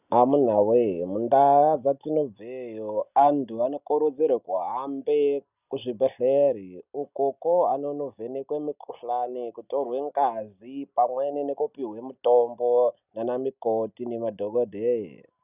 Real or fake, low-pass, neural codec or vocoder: real; 3.6 kHz; none